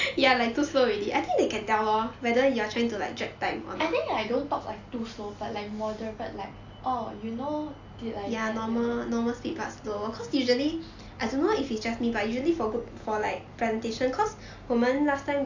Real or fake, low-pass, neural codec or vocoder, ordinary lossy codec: real; 7.2 kHz; none; AAC, 48 kbps